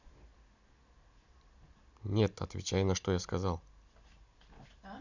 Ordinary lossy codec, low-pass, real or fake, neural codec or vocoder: none; 7.2 kHz; real; none